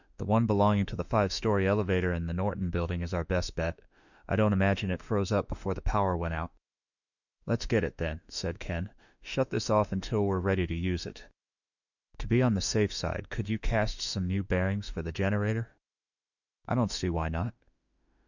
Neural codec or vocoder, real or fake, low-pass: autoencoder, 48 kHz, 32 numbers a frame, DAC-VAE, trained on Japanese speech; fake; 7.2 kHz